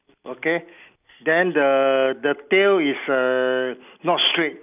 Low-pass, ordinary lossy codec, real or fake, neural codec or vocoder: 3.6 kHz; none; real; none